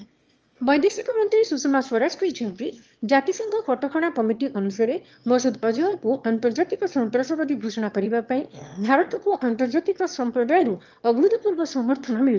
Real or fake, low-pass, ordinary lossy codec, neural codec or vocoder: fake; 7.2 kHz; Opus, 24 kbps; autoencoder, 22.05 kHz, a latent of 192 numbers a frame, VITS, trained on one speaker